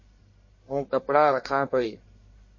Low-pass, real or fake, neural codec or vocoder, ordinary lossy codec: 7.2 kHz; fake; codec, 44.1 kHz, 1.7 kbps, Pupu-Codec; MP3, 32 kbps